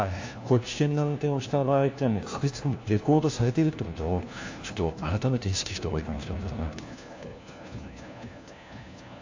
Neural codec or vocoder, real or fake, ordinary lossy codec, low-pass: codec, 16 kHz, 1 kbps, FunCodec, trained on LibriTTS, 50 frames a second; fake; AAC, 48 kbps; 7.2 kHz